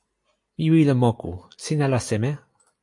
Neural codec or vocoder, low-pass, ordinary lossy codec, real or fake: none; 10.8 kHz; AAC, 64 kbps; real